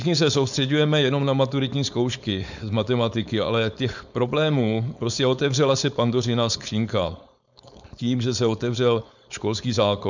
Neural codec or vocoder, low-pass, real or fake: codec, 16 kHz, 4.8 kbps, FACodec; 7.2 kHz; fake